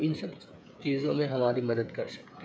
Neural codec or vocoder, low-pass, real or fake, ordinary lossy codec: codec, 16 kHz, 16 kbps, FreqCodec, smaller model; none; fake; none